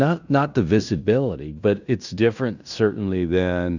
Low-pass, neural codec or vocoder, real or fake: 7.2 kHz; codec, 16 kHz in and 24 kHz out, 0.9 kbps, LongCat-Audio-Codec, fine tuned four codebook decoder; fake